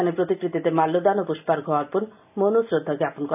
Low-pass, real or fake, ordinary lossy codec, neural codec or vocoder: 3.6 kHz; real; none; none